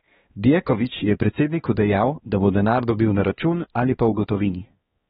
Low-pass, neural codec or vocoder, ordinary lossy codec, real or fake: 7.2 kHz; codec, 16 kHz, 2 kbps, X-Codec, HuBERT features, trained on balanced general audio; AAC, 16 kbps; fake